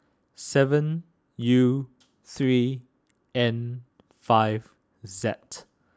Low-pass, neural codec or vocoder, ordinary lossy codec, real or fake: none; none; none; real